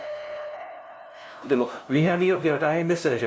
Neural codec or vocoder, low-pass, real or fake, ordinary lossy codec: codec, 16 kHz, 0.5 kbps, FunCodec, trained on LibriTTS, 25 frames a second; none; fake; none